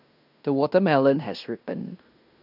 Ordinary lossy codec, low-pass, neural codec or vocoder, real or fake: none; 5.4 kHz; codec, 16 kHz in and 24 kHz out, 0.9 kbps, LongCat-Audio-Codec, fine tuned four codebook decoder; fake